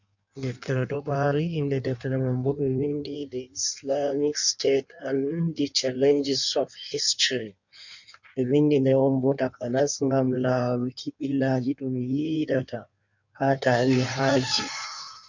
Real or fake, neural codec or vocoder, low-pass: fake; codec, 16 kHz in and 24 kHz out, 1.1 kbps, FireRedTTS-2 codec; 7.2 kHz